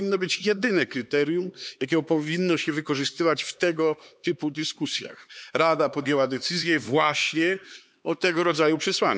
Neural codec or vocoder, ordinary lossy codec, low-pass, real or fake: codec, 16 kHz, 4 kbps, X-Codec, HuBERT features, trained on LibriSpeech; none; none; fake